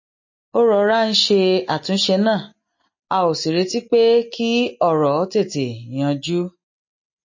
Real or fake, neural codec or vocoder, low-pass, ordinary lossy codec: real; none; 7.2 kHz; MP3, 32 kbps